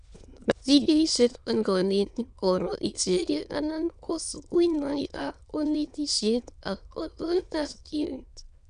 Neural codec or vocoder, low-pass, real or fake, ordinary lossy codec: autoencoder, 22.05 kHz, a latent of 192 numbers a frame, VITS, trained on many speakers; 9.9 kHz; fake; none